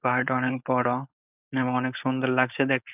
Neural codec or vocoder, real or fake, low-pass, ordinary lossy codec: codec, 16 kHz, 4.8 kbps, FACodec; fake; 3.6 kHz; none